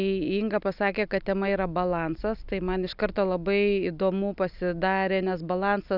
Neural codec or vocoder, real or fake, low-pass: none; real; 5.4 kHz